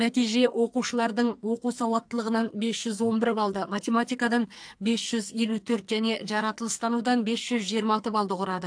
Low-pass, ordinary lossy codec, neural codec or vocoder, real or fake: 9.9 kHz; AAC, 64 kbps; codec, 44.1 kHz, 2.6 kbps, SNAC; fake